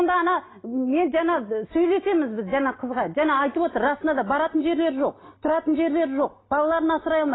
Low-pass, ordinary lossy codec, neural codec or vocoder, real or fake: 7.2 kHz; AAC, 16 kbps; none; real